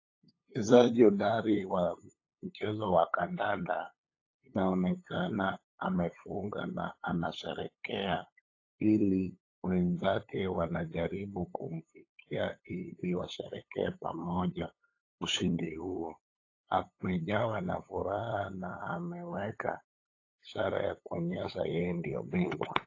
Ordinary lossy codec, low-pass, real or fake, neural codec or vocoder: AAC, 32 kbps; 7.2 kHz; fake; codec, 16 kHz, 8 kbps, FunCodec, trained on LibriTTS, 25 frames a second